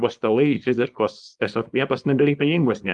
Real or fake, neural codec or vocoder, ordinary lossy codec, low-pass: fake; codec, 24 kHz, 0.9 kbps, WavTokenizer, small release; Opus, 32 kbps; 10.8 kHz